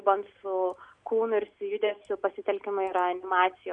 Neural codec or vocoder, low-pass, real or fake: none; 9.9 kHz; real